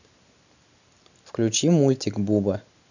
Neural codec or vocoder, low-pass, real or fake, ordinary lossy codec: none; 7.2 kHz; real; none